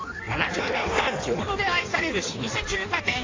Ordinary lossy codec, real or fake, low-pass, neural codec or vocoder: AAC, 32 kbps; fake; 7.2 kHz; codec, 16 kHz in and 24 kHz out, 1.1 kbps, FireRedTTS-2 codec